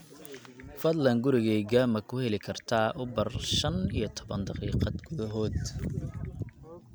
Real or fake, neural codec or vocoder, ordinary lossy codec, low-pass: real; none; none; none